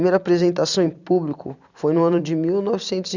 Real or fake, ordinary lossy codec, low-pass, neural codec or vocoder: fake; none; 7.2 kHz; vocoder, 44.1 kHz, 128 mel bands every 256 samples, BigVGAN v2